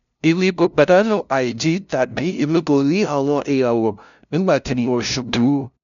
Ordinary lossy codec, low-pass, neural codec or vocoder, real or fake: none; 7.2 kHz; codec, 16 kHz, 0.5 kbps, FunCodec, trained on LibriTTS, 25 frames a second; fake